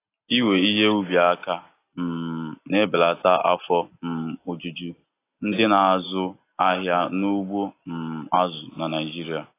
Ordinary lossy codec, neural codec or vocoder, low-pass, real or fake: AAC, 24 kbps; none; 3.6 kHz; real